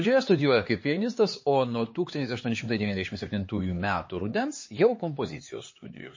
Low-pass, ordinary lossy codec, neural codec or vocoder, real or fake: 7.2 kHz; MP3, 32 kbps; codec, 16 kHz, 4 kbps, X-Codec, HuBERT features, trained on LibriSpeech; fake